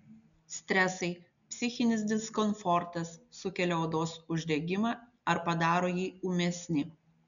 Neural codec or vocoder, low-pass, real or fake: none; 7.2 kHz; real